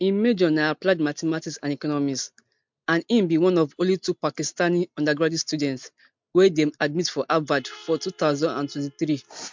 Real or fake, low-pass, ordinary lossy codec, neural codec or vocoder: real; 7.2 kHz; MP3, 64 kbps; none